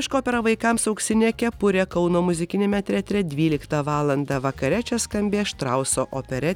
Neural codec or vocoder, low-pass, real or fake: none; 19.8 kHz; real